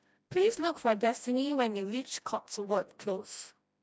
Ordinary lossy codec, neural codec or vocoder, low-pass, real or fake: none; codec, 16 kHz, 1 kbps, FreqCodec, smaller model; none; fake